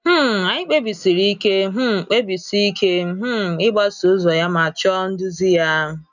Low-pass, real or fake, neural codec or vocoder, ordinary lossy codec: 7.2 kHz; real; none; none